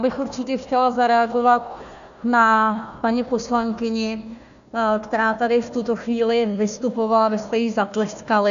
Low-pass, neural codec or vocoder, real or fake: 7.2 kHz; codec, 16 kHz, 1 kbps, FunCodec, trained on Chinese and English, 50 frames a second; fake